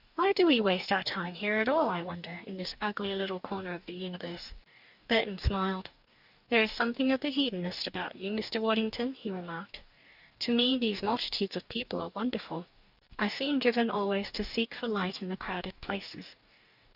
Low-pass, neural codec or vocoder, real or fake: 5.4 kHz; codec, 44.1 kHz, 2.6 kbps, DAC; fake